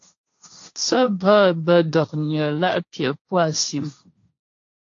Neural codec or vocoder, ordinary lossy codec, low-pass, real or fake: codec, 16 kHz, 1.1 kbps, Voila-Tokenizer; AAC, 48 kbps; 7.2 kHz; fake